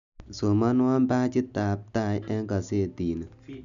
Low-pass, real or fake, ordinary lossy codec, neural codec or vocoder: 7.2 kHz; real; none; none